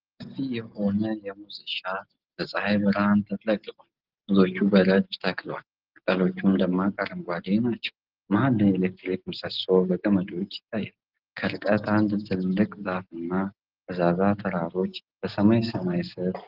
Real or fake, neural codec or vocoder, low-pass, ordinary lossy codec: real; none; 5.4 kHz; Opus, 16 kbps